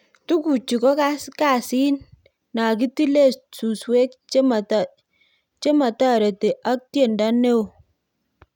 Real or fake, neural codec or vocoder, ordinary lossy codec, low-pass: real; none; none; 19.8 kHz